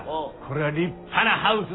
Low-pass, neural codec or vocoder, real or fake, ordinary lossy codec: 7.2 kHz; none; real; AAC, 16 kbps